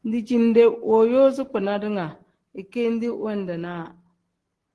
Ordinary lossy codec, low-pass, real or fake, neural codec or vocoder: Opus, 16 kbps; 10.8 kHz; real; none